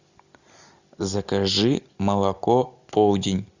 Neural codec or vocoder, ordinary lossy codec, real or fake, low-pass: none; Opus, 64 kbps; real; 7.2 kHz